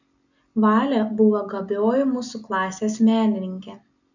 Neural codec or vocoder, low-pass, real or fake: none; 7.2 kHz; real